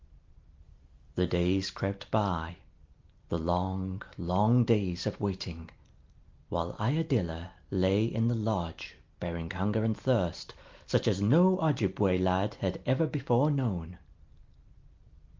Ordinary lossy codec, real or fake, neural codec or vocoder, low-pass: Opus, 32 kbps; real; none; 7.2 kHz